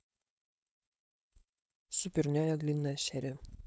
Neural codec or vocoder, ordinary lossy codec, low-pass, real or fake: codec, 16 kHz, 4.8 kbps, FACodec; none; none; fake